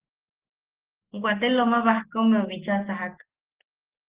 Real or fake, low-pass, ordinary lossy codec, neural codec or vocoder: real; 3.6 kHz; Opus, 16 kbps; none